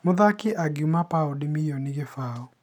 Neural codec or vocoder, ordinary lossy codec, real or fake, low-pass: none; none; real; 19.8 kHz